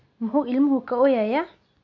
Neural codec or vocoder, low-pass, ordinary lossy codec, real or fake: none; 7.2 kHz; MP3, 48 kbps; real